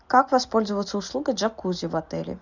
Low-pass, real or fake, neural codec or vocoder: 7.2 kHz; real; none